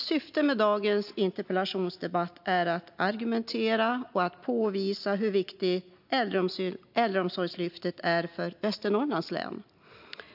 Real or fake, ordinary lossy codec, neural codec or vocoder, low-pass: real; AAC, 48 kbps; none; 5.4 kHz